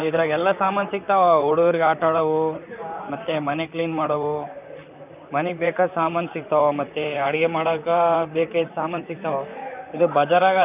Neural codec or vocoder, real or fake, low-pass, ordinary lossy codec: vocoder, 44.1 kHz, 128 mel bands, Pupu-Vocoder; fake; 3.6 kHz; none